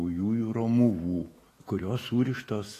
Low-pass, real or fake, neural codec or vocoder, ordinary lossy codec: 14.4 kHz; fake; autoencoder, 48 kHz, 128 numbers a frame, DAC-VAE, trained on Japanese speech; AAC, 48 kbps